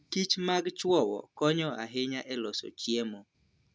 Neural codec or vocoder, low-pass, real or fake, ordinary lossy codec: none; none; real; none